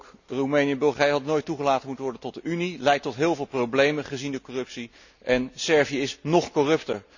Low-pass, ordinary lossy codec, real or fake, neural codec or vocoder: 7.2 kHz; none; real; none